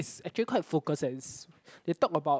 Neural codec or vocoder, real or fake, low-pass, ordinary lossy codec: none; real; none; none